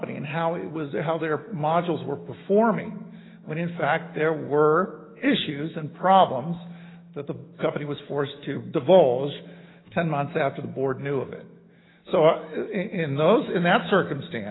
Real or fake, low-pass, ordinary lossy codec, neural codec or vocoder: fake; 7.2 kHz; AAC, 16 kbps; autoencoder, 48 kHz, 128 numbers a frame, DAC-VAE, trained on Japanese speech